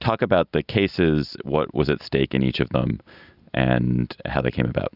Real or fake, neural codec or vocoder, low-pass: real; none; 5.4 kHz